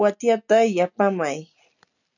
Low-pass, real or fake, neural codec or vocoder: 7.2 kHz; real; none